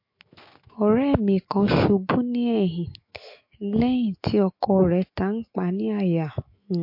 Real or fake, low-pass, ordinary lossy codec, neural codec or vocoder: fake; 5.4 kHz; MP3, 32 kbps; autoencoder, 48 kHz, 128 numbers a frame, DAC-VAE, trained on Japanese speech